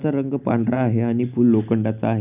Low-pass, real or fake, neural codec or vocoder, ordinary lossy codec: 3.6 kHz; real; none; none